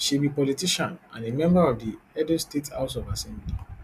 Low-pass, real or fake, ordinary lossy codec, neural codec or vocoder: 14.4 kHz; real; none; none